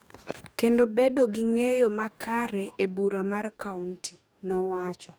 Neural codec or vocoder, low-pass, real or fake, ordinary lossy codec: codec, 44.1 kHz, 2.6 kbps, DAC; none; fake; none